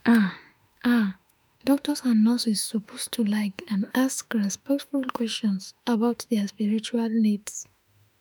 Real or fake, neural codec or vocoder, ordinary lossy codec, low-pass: fake; autoencoder, 48 kHz, 32 numbers a frame, DAC-VAE, trained on Japanese speech; none; none